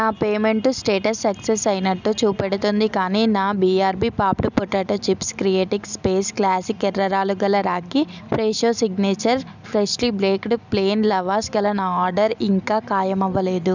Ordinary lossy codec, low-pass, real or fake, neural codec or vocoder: none; 7.2 kHz; real; none